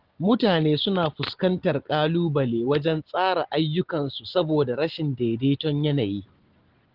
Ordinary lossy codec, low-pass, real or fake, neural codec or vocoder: Opus, 16 kbps; 5.4 kHz; real; none